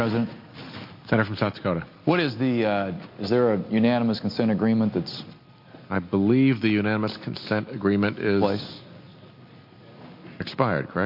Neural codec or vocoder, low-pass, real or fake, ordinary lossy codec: none; 5.4 kHz; real; MP3, 32 kbps